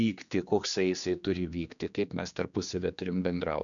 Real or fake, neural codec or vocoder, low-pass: fake; codec, 16 kHz, 2 kbps, X-Codec, HuBERT features, trained on general audio; 7.2 kHz